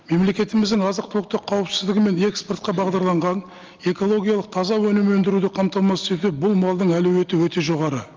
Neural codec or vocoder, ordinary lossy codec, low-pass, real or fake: none; Opus, 24 kbps; 7.2 kHz; real